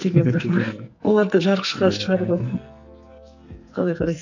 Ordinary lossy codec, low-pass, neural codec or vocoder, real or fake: none; 7.2 kHz; codec, 44.1 kHz, 2.6 kbps, SNAC; fake